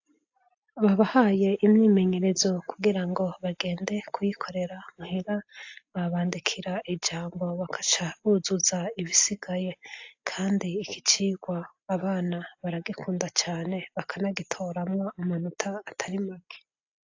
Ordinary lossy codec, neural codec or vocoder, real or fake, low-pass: AAC, 48 kbps; none; real; 7.2 kHz